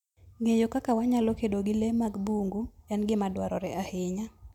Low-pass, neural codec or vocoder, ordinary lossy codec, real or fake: 19.8 kHz; none; none; real